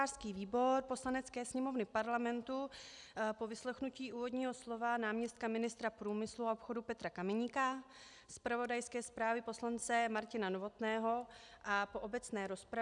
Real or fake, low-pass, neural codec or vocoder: real; 10.8 kHz; none